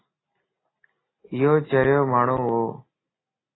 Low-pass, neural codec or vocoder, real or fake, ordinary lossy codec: 7.2 kHz; none; real; AAC, 16 kbps